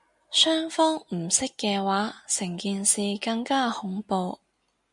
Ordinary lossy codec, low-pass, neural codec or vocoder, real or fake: MP3, 96 kbps; 10.8 kHz; none; real